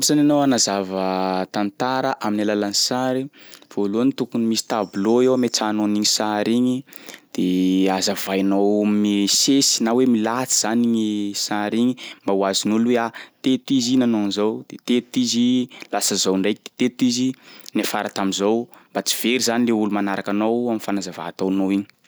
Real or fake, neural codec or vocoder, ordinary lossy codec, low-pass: real; none; none; none